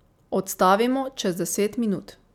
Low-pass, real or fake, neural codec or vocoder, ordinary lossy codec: 19.8 kHz; real; none; none